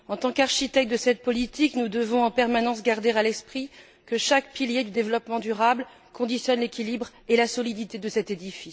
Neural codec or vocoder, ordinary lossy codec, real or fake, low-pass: none; none; real; none